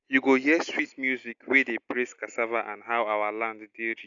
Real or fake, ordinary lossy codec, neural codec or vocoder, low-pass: real; none; none; 7.2 kHz